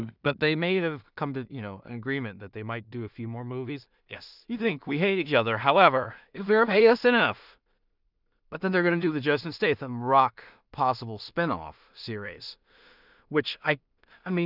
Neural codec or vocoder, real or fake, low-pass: codec, 16 kHz in and 24 kHz out, 0.4 kbps, LongCat-Audio-Codec, two codebook decoder; fake; 5.4 kHz